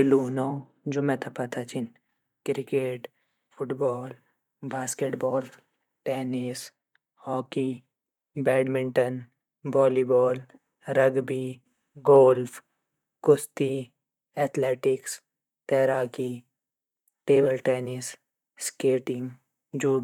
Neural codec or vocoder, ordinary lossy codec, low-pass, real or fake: vocoder, 44.1 kHz, 128 mel bands, Pupu-Vocoder; none; 19.8 kHz; fake